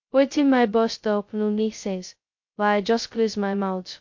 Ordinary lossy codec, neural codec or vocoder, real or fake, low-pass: MP3, 48 kbps; codec, 16 kHz, 0.2 kbps, FocalCodec; fake; 7.2 kHz